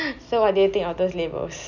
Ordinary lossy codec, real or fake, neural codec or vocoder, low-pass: none; real; none; 7.2 kHz